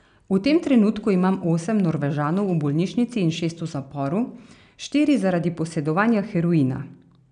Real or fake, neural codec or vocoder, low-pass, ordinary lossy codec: real; none; 9.9 kHz; none